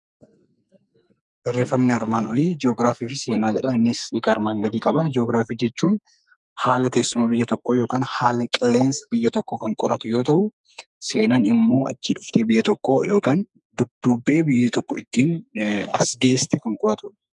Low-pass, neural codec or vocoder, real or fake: 10.8 kHz; codec, 44.1 kHz, 2.6 kbps, SNAC; fake